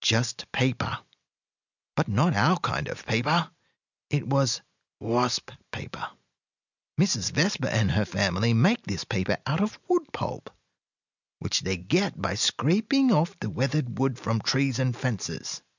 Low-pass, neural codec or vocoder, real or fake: 7.2 kHz; none; real